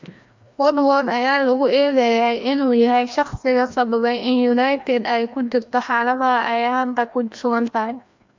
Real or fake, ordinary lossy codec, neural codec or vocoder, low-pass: fake; MP3, 48 kbps; codec, 16 kHz, 1 kbps, FreqCodec, larger model; 7.2 kHz